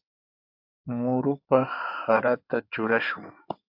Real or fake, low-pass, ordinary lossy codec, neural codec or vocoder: fake; 5.4 kHz; AAC, 48 kbps; codec, 16 kHz in and 24 kHz out, 2.2 kbps, FireRedTTS-2 codec